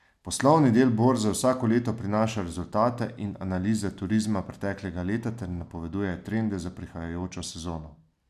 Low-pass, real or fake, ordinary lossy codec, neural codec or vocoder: 14.4 kHz; real; none; none